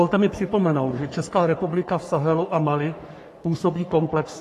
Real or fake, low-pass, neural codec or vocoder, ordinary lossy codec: fake; 14.4 kHz; codec, 44.1 kHz, 3.4 kbps, Pupu-Codec; AAC, 48 kbps